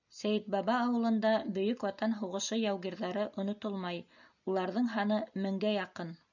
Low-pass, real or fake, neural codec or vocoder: 7.2 kHz; real; none